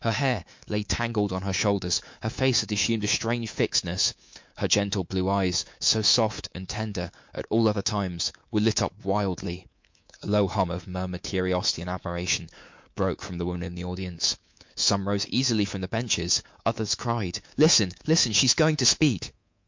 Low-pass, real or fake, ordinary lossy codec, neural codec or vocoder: 7.2 kHz; fake; MP3, 48 kbps; codec, 24 kHz, 3.1 kbps, DualCodec